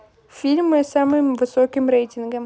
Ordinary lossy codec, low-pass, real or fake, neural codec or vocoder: none; none; real; none